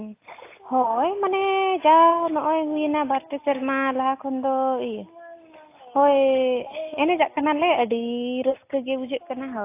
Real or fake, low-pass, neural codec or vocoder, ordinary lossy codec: real; 3.6 kHz; none; AAC, 24 kbps